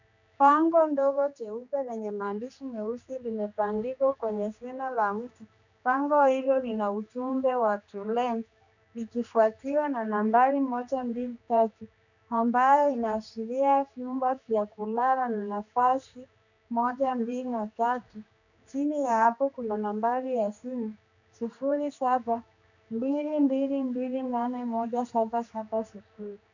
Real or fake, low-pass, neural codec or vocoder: fake; 7.2 kHz; codec, 16 kHz, 2 kbps, X-Codec, HuBERT features, trained on general audio